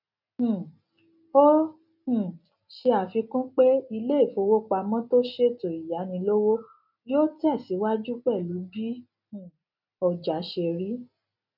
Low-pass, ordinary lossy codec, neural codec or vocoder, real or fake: 5.4 kHz; none; none; real